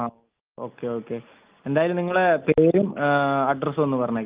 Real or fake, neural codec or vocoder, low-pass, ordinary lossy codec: real; none; 3.6 kHz; Opus, 32 kbps